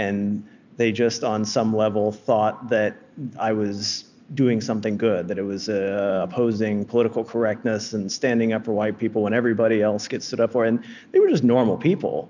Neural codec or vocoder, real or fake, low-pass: none; real; 7.2 kHz